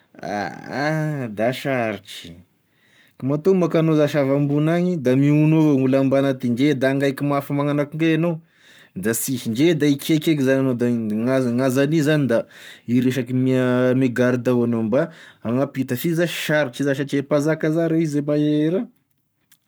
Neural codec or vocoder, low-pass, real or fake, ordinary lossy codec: codec, 44.1 kHz, 7.8 kbps, Pupu-Codec; none; fake; none